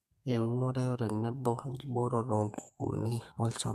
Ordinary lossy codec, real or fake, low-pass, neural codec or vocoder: MP3, 64 kbps; fake; 14.4 kHz; codec, 32 kHz, 1.9 kbps, SNAC